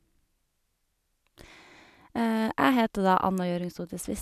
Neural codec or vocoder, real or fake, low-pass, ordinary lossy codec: none; real; 14.4 kHz; none